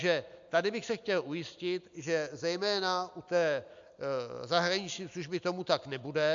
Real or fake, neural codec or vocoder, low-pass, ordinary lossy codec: real; none; 7.2 kHz; AAC, 64 kbps